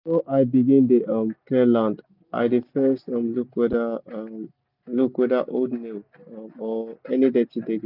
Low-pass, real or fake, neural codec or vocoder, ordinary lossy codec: 5.4 kHz; real; none; none